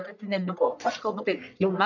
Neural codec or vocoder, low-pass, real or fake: codec, 44.1 kHz, 1.7 kbps, Pupu-Codec; 7.2 kHz; fake